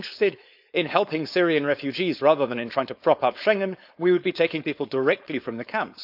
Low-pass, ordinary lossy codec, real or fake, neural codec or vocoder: 5.4 kHz; none; fake; codec, 16 kHz, 4.8 kbps, FACodec